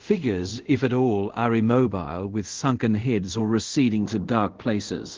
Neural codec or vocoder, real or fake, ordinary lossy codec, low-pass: codec, 24 kHz, 0.5 kbps, DualCodec; fake; Opus, 16 kbps; 7.2 kHz